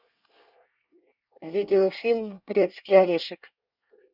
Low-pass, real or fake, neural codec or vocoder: 5.4 kHz; fake; codec, 24 kHz, 1 kbps, SNAC